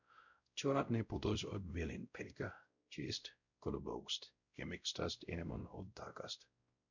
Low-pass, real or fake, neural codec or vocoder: 7.2 kHz; fake; codec, 16 kHz, 0.5 kbps, X-Codec, WavLM features, trained on Multilingual LibriSpeech